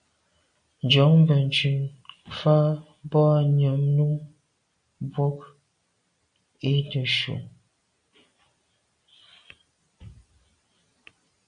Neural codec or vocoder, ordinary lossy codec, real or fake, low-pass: none; MP3, 48 kbps; real; 9.9 kHz